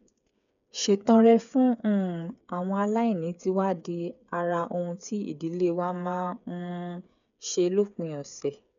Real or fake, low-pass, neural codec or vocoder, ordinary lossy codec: fake; 7.2 kHz; codec, 16 kHz, 8 kbps, FreqCodec, smaller model; none